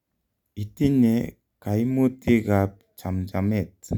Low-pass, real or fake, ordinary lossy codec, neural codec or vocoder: 19.8 kHz; real; none; none